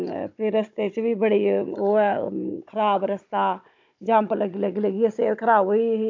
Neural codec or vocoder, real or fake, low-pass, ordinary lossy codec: codec, 16 kHz, 16 kbps, FunCodec, trained on Chinese and English, 50 frames a second; fake; 7.2 kHz; AAC, 48 kbps